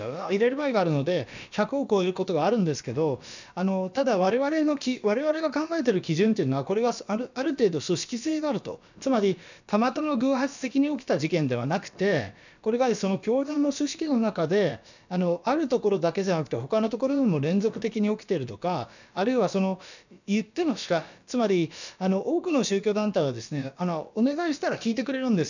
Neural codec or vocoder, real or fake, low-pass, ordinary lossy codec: codec, 16 kHz, about 1 kbps, DyCAST, with the encoder's durations; fake; 7.2 kHz; none